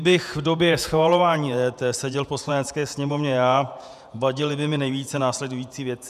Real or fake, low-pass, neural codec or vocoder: fake; 14.4 kHz; vocoder, 48 kHz, 128 mel bands, Vocos